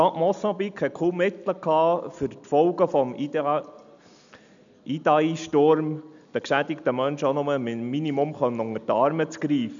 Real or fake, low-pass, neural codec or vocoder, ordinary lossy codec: real; 7.2 kHz; none; none